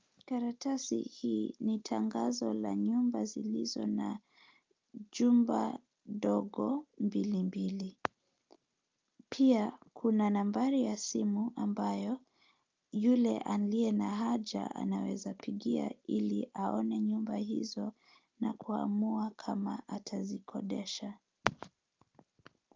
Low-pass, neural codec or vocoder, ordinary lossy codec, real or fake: 7.2 kHz; none; Opus, 24 kbps; real